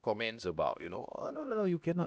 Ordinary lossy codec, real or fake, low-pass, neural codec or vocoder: none; fake; none; codec, 16 kHz, 1 kbps, X-Codec, HuBERT features, trained on LibriSpeech